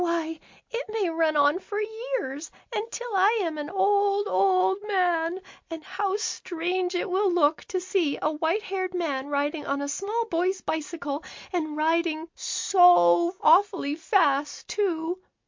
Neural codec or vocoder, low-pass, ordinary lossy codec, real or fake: none; 7.2 kHz; MP3, 48 kbps; real